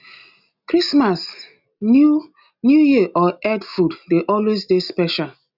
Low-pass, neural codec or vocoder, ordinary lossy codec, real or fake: 5.4 kHz; none; none; real